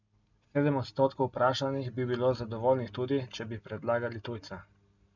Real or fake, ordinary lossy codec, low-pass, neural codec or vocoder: real; none; 7.2 kHz; none